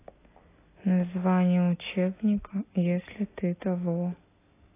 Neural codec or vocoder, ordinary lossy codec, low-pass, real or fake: none; AAC, 16 kbps; 3.6 kHz; real